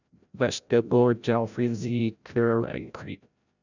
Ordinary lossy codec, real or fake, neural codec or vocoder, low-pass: none; fake; codec, 16 kHz, 0.5 kbps, FreqCodec, larger model; 7.2 kHz